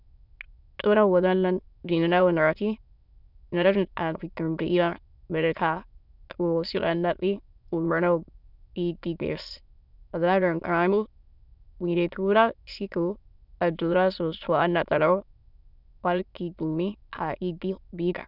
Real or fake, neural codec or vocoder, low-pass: fake; autoencoder, 22.05 kHz, a latent of 192 numbers a frame, VITS, trained on many speakers; 5.4 kHz